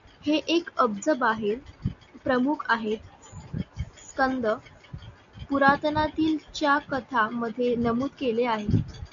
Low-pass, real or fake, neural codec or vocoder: 7.2 kHz; real; none